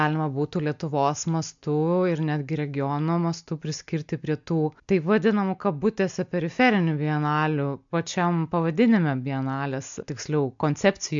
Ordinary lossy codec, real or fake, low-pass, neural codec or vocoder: MP3, 64 kbps; real; 7.2 kHz; none